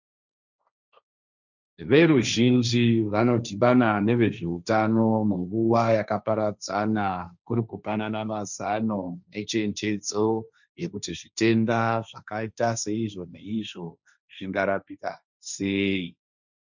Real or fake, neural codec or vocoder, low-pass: fake; codec, 16 kHz, 1.1 kbps, Voila-Tokenizer; 7.2 kHz